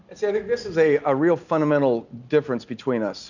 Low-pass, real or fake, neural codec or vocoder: 7.2 kHz; real; none